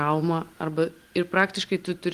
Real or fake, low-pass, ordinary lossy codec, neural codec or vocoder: real; 14.4 kHz; Opus, 24 kbps; none